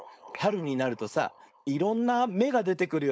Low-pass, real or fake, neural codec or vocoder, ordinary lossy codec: none; fake; codec, 16 kHz, 4.8 kbps, FACodec; none